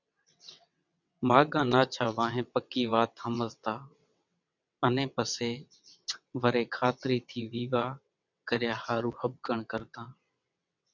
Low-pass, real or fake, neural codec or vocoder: 7.2 kHz; fake; vocoder, 22.05 kHz, 80 mel bands, WaveNeXt